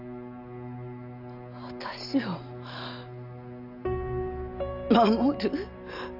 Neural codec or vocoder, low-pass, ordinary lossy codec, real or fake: none; 5.4 kHz; none; real